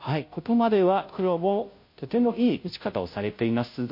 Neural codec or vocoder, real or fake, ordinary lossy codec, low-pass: codec, 16 kHz, 0.5 kbps, FunCodec, trained on Chinese and English, 25 frames a second; fake; MP3, 32 kbps; 5.4 kHz